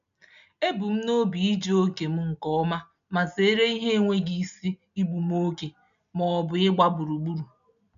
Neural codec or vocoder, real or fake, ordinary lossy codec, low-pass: none; real; none; 7.2 kHz